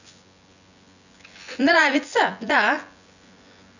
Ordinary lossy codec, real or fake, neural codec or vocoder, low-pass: none; fake; vocoder, 24 kHz, 100 mel bands, Vocos; 7.2 kHz